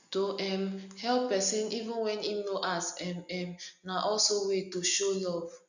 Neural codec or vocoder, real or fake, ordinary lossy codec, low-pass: none; real; none; 7.2 kHz